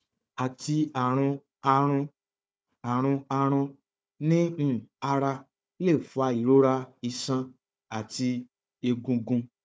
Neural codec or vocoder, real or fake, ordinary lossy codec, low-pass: codec, 16 kHz, 4 kbps, FunCodec, trained on Chinese and English, 50 frames a second; fake; none; none